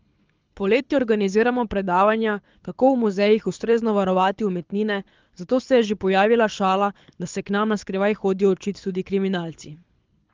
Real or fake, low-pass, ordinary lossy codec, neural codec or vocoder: fake; 7.2 kHz; Opus, 32 kbps; codec, 24 kHz, 6 kbps, HILCodec